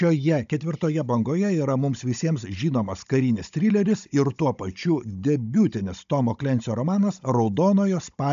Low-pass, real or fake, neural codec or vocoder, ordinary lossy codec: 7.2 kHz; fake; codec, 16 kHz, 16 kbps, FunCodec, trained on Chinese and English, 50 frames a second; MP3, 64 kbps